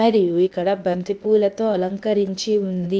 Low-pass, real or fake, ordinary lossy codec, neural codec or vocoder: none; fake; none; codec, 16 kHz, 0.8 kbps, ZipCodec